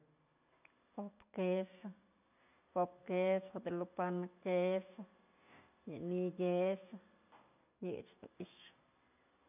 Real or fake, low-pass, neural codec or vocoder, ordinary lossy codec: real; 3.6 kHz; none; none